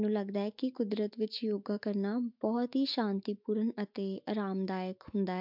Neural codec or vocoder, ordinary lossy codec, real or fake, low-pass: autoencoder, 48 kHz, 128 numbers a frame, DAC-VAE, trained on Japanese speech; MP3, 48 kbps; fake; 5.4 kHz